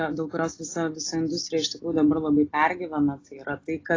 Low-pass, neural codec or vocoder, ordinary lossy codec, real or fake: 7.2 kHz; none; AAC, 32 kbps; real